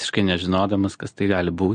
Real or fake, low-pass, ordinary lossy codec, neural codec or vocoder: real; 9.9 kHz; MP3, 48 kbps; none